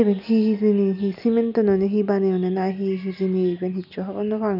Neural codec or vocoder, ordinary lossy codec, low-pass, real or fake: none; none; 5.4 kHz; real